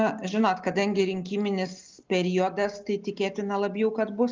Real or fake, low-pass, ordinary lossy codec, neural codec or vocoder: real; 7.2 kHz; Opus, 32 kbps; none